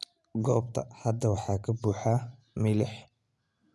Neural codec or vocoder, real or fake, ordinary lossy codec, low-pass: none; real; none; none